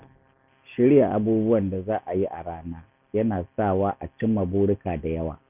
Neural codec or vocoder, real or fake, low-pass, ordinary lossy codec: none; real; 3.6 kHz; none